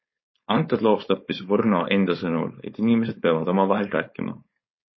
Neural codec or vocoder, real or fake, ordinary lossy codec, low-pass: codec, 16 kHz, 4.8 kbps, FACodec; fake; MP3, 24 kbps; 7.2 kHz